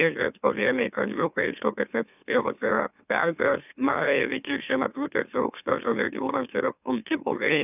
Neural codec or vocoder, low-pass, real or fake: autoencoder, 44.1 kHz, a latent of 192 numbers a frame, MeloTTS; 3.6 kHz; fake